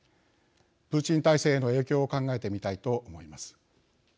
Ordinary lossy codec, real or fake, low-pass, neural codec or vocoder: none; real; none; none